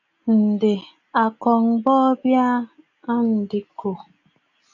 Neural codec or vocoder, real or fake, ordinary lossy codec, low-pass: none; real; AAC, 48 kbps; 7.2 kHz